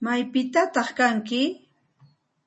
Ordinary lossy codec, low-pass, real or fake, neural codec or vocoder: MP3, 32 kbps; 10.8 kHz; real; none